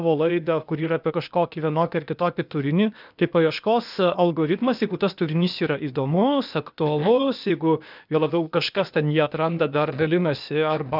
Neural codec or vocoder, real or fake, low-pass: codec, 16 kHz, 0.8 kbps, ZipCodec; fake; 5.4 kHz